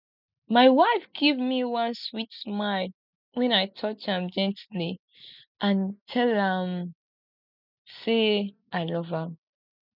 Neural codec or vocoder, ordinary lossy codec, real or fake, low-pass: none; none; real; 5.4 kHz